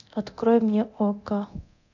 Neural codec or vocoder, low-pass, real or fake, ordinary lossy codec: codec, 24 kHz, 0.9 kbps, DualCodec; 7.2 kHz; fake; none